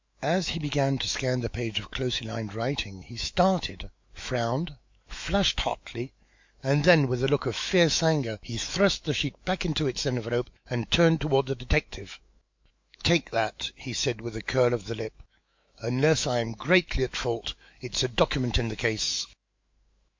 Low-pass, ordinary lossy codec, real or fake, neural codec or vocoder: 7.2 kHz; MP3, 48 kbps; fake; autoencoder, 48 kHz, 128 numbers a frame, DAC-VAE, trained on Japanese speech